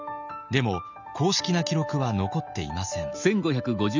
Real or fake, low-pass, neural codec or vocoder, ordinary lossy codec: real; 7.2 kHz; none; none